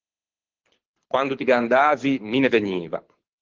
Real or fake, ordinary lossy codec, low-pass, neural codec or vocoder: fake; Opus, 16 kbps; 7.2 kHz; codec, 24 kHz, 6 kbps, HILCodec